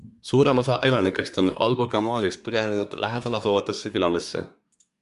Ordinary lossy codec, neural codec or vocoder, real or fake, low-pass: MP3, 96 kbps; codec, 24 kHz, 1 kbps, SNAC; fake; 10.8 kHz